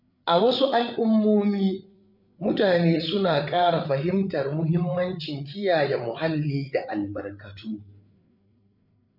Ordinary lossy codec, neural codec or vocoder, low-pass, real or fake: none; codec, 16 kHz, 8 kbps, FreqCodec, larger model; 5.4 kHz; fake